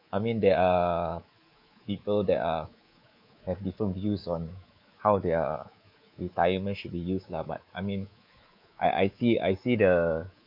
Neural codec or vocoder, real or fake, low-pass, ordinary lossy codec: codec, 24 kHz, 3.1 kbps, DualCodec; fake; 5.4 kHz; MP3, 48 kbps